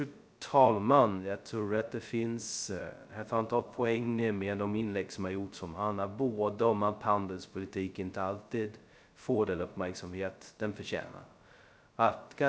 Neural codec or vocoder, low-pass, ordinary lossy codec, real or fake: codec, 16 kHz, 0.2 kbps, FocalCodec; none; none; fake